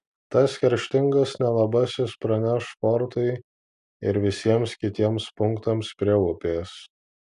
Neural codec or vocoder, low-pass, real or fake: none; 10.8 kHz; real